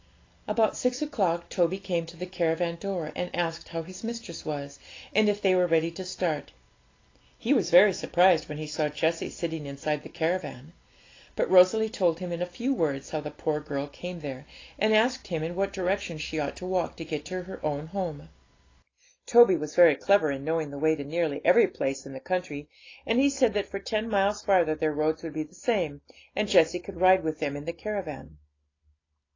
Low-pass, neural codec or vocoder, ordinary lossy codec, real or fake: 7.2 kHz; none; AAC, 32 kbps; real